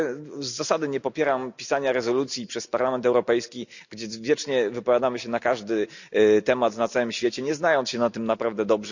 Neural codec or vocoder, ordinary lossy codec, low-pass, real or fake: none; none; 7.2 kHz; real